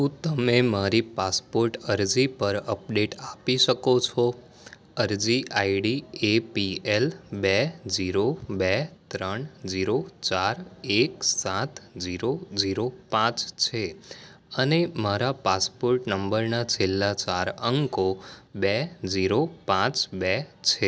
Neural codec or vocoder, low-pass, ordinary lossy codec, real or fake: none; none; none; real